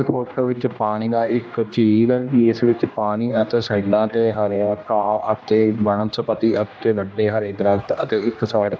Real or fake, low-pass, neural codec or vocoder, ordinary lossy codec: fake; none; codec, 16 kHz, 1 kbps, X-Codec, HuBERT features, trained on general audio; none